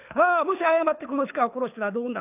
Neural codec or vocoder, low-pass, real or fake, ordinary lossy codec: codec, 24 kHz, 6 kbps, HILCodec; 3.6 kHz; fake; none